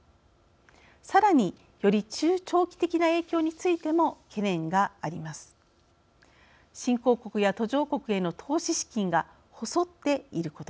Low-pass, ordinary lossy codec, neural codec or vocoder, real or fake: none; none; none; real